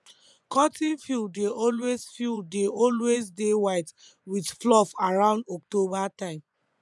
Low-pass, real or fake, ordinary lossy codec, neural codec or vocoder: none; fake; none; vocoder, 24 kHz, 100 mel bands, Vocos